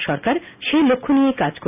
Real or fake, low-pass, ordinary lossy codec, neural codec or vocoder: real; 3.6 kHz; none; none